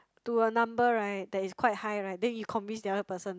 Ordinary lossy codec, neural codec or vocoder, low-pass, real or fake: none; none; none; real